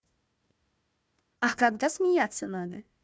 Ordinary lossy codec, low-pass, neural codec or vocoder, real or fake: none; none; codec, 16 kHz, 1 kbps, FunCodec, trained on Chinese and English, 50 frames a second; fake